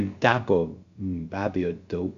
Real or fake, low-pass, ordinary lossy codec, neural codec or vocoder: fake; 7.2 kHz; Opus, 64 kbps; codec, 16 kHz, about 1 kbps, DyCAST, with the encoder's durations